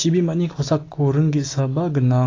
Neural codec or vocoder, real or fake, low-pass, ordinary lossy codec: none; real; 7.2 kHz; AAC, 32 kbps